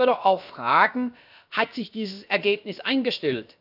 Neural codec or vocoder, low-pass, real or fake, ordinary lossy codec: codec, 16 kHz, about 1 kbps, DyCAST, with the encoder's durations; 5.4 kHz; fake; none